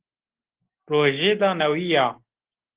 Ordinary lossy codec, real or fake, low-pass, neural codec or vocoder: Opus, 16 kbps; real; 3.6 kHz; none